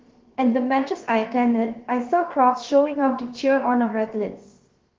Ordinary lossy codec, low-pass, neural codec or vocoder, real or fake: Opus, 16 kbps; 7.2 kHz; codec, 16 kHz, about 1 kbps, DyCAST, with the encoder's durations; fake